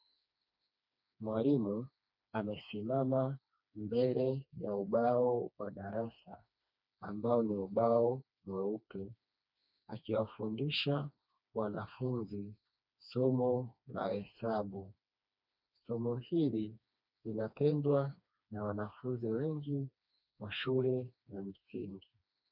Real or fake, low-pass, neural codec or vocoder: fake; 5.4 kHz; codec, 16 kHz, 2 kbps, FreqCodec, smaller model